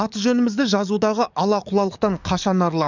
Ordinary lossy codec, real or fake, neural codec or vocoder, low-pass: none; fake; codec, 44.1 kHz, 7.8 kbps, DAC; 7.2 kHz